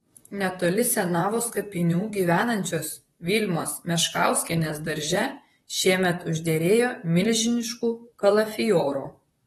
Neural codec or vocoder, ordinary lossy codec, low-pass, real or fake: vocoder, 44.1 kHz, 128 mel bands, Pupu-Vocoder; AAC, 32 kbps; 19.8 kHz; fake